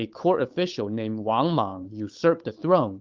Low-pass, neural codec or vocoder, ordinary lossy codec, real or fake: 7.2 kHz; codec, 16 kHz, 8 kbps, FunCodec, trained on Chinese and English, 25 frames a second; Opus, 32 kbps; fake